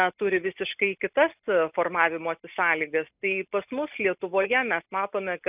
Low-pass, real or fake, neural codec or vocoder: 3.6 kHz; real; none